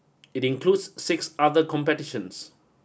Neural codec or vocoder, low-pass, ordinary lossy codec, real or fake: none; none; none; real